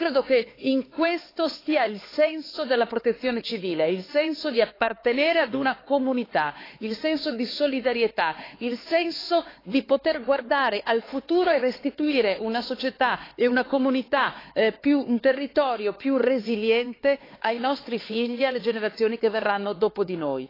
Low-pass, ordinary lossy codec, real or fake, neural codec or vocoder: 5.4 kHz; AAC, 24 kbps; fake; codec, 16 kHz, 4 kbps, X-Codec, HuBERT features, trained on LibriSpeech